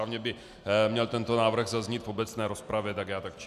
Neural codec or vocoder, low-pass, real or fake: none; 14.4 kHz; real